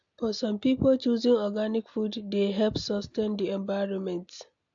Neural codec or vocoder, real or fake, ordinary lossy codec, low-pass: none; real; AAC, 64 kbps; 7.2 kHz